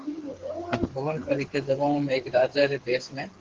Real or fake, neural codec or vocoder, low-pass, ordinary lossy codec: fake; codec, 16 kHz, 4 kbps, FreqCodec, smaller model; 7.2 kHz; Opus, 16 kbps